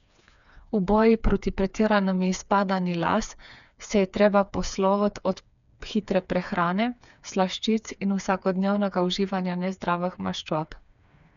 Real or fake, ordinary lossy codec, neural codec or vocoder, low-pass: fake; none; codec, 16 kHz, 4 kbps, FreqCodec, smaller model; 7.2 kHz